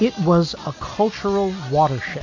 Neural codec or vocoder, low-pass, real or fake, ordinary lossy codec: none; 7.2 kHz; real; AAC, 48 kbps